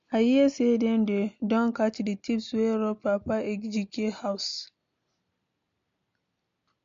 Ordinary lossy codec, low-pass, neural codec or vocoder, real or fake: MP3, 48 kbps; 7.2 kHz; none; real